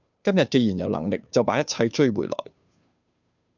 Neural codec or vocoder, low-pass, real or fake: codec, 16 kHz, 2 kbps, FunCodec, trained on Chinese and English, 25 frames a second; 7.2 kHz; fake